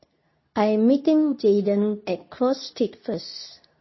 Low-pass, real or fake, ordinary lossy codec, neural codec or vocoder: 7.2 kHz; fake; MP3, 24 kbps; codec, 24 kHz, 0.9 kbps, WavTokenizer, medium speech release version 2